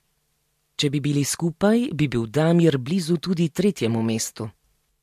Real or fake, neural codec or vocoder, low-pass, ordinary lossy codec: real; none; 14.4 kHz; MP3, 64 kbps